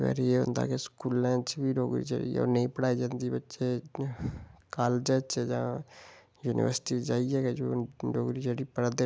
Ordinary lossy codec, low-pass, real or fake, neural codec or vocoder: none; none; real; none